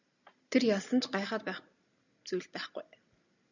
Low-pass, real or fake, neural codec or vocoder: 7.2 kHz; real; none